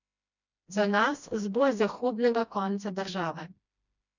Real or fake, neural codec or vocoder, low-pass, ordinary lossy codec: fake; codec, 16 kHz, 1 kbps, FreqCodec, smaller model; 7.2 kHz; none